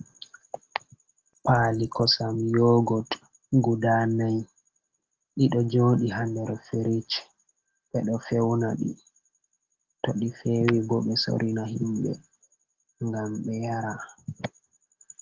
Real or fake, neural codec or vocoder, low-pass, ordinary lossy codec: real; none; 7.2 kHz; Opus, 16 kbps